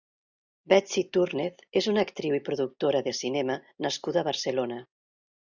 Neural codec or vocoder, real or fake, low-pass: none; real; 7.2 kHz